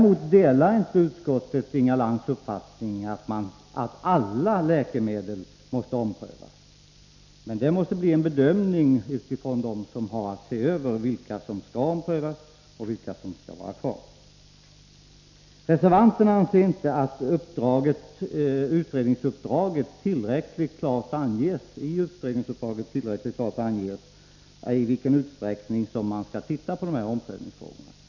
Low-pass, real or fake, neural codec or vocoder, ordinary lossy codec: 7.2 kHz; real; none; none